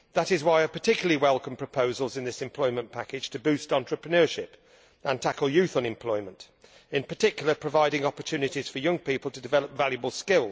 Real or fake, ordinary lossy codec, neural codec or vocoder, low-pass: real; none; none; none